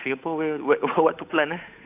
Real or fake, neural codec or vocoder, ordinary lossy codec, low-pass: fake; codec, 16 kHz, 8 kbps, FunCodec, trained on Chinese and English, 25 frames a second; AAC, 32 kbps; 3.6 kHz